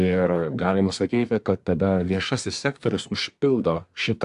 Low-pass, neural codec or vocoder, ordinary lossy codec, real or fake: 10.8 kHz; codec, 24 kHz, 1 kbps, SNAC; AAC, 64 kbps; fake